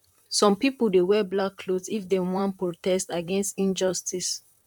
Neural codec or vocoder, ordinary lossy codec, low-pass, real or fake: vocoder, 44.1 kHz, 128 mel bands, Pupu-Vocoder; none; 19.8 kHz; fake